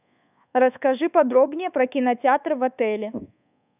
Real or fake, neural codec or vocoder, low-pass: fake; codec, 24 kHz, 1.2 kbps, DualCodec; 3.6 kHz